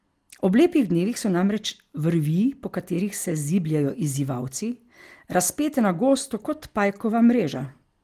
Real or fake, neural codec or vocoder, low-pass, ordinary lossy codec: real; none; 14.4 kHz; Opus, 24 kbps